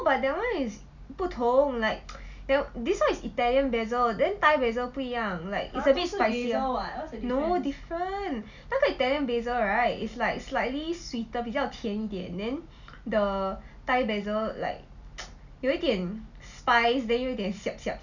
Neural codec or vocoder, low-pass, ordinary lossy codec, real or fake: none; 7.2 kHz; none; real